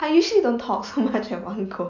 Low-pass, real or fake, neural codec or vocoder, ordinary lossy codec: 7.2 kHz; real; none; none